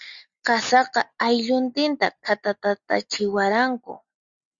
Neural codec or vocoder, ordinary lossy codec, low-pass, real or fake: none; Opus, 64 kbps; 7.2 kHz; real